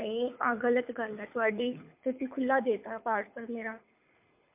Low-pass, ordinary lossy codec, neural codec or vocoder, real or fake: 3.6 kHz; MP3, 32 kbps; codec, 24 kHz, 6 kbps, HILCodec; fake